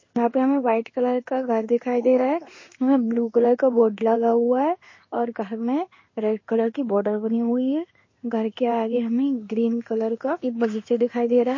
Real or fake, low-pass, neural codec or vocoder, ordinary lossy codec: fake; 7.2 kHz; codec, 16 kHz in and 24 kHz out, 1 kbps, XY-Tokenizer; MP3, 32 kbps